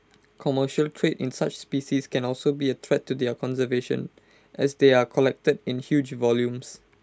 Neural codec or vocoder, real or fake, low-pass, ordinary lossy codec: none; real; none; none